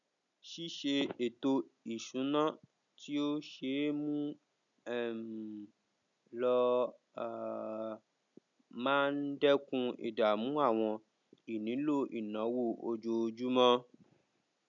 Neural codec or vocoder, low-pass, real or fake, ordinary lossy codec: none; 7.2 kHz; real; none